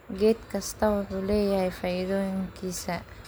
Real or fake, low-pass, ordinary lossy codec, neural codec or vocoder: real; none; none; none